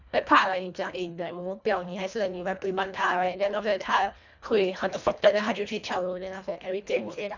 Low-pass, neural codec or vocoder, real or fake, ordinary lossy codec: 7.2 kHz; codec, 24 kHz, 1.5 kbps, HILCodec; fake; none